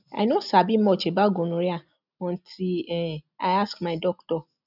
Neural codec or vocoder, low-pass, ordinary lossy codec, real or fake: none; 5.4 kHz; none; real